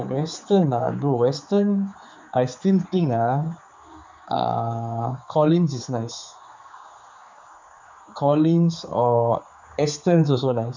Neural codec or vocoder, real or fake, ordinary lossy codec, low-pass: codec, 16 kHz, 4 kbps, X-Codec, HuBERT features, trained on general audio; fake; MP3, 64 kbps; 7.2 kHz